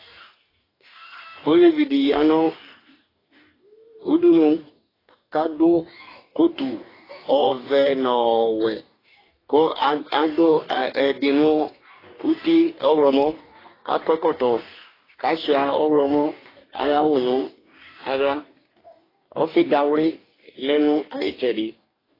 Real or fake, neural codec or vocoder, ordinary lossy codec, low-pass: fake; codec, 44.1 kHz, 2.6 kbps, DAC; AAC, 24 kbps; 5.4 kHz